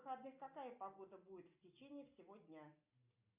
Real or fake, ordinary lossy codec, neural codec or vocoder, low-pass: real; MP3, 32 kbps; none; 3.6 kHz